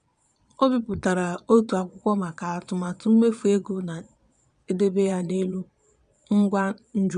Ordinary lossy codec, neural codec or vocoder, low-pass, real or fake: none; vocoder, 22.05 kHz, 80 mel bands, Vocos; 9.9 kHz; fake